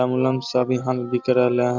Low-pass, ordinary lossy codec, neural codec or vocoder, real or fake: 7.2 kHz; none; none; real